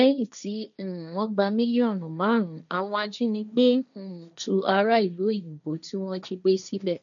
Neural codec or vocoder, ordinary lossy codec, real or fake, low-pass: codec, 16 kHz, 1.1 kbps, Voila-Tokenizer; none; fake; 7.2 kHz